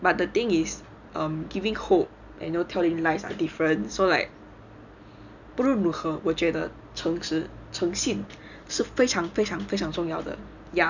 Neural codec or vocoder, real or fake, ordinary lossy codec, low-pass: none; real; none; 7.2 kHz